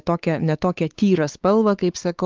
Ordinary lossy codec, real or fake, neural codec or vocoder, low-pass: Opus, 32 kbps; fake; codec, 16 kHz, 4 kbps, X-Codec, WavLM features, trained on Multilingual LibriSpeech; 7.2 kHz